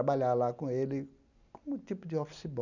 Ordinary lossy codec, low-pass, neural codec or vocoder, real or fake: none; 7.2 kHz; none; real